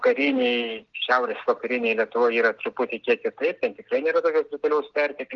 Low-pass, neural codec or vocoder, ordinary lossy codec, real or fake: 7.2 kHz; none; Opus, 16 kbps; real